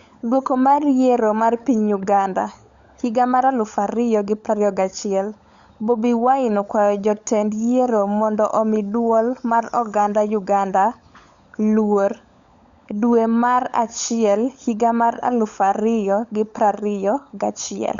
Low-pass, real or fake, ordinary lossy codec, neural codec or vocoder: 7.2 kHz; fake; Opus, 64 kbps; codec, 16 kHz, 16 kbps, FunCodec, trained on LibriTTS, 50 frames a second